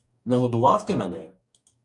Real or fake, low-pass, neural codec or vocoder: fake; 10.8 kHz; codec, 44.1 kHz, 2.6 kbps, DAC